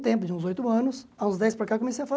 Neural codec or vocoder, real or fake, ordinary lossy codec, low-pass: none; real; none; none